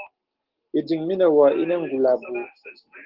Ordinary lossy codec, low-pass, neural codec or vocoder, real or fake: Opus, 16 kbps; 5.4 kHz; none; real